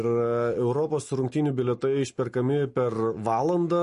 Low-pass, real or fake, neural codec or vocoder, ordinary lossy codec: 10.8 kHz; real; none; MP3, 48 kbps